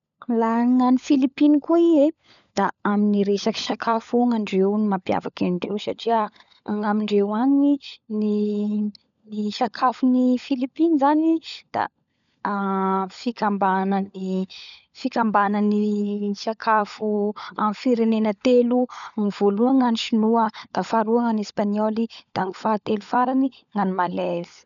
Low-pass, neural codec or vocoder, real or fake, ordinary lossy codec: 7.2 kHz; codec, 16 kHz, 16 kbps, FunCodec, trained on LibriTTS, 50 frames a second; fake; none